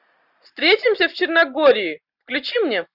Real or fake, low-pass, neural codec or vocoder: real; 5.4 kHz; none